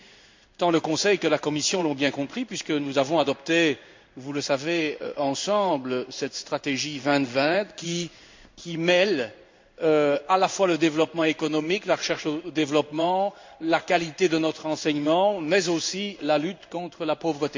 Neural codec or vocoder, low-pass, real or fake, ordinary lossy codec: codec, 16 kHz in and 24 kHz out, 1 kbps, XY-Tokenizer; 7.2 kHz; fake; MP3, 64 kbps